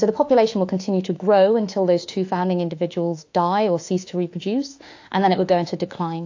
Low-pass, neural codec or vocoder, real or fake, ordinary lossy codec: 7.2 kHz; autoencoder, 48 kHz, 32 numbers a frame, DAC-VAE, trained on Japanese speech; fake; AAC, 48 kbps